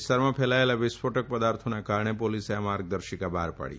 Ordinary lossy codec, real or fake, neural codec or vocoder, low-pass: none; real; none; none